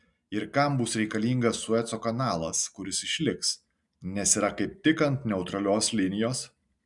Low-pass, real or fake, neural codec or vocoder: 10.8 kHz; real; none